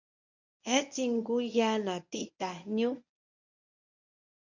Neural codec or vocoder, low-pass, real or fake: codec, 24 kHz, 0.9 kbps, WavTokenizer, medium speech release version 1; 7.2 kHz; fake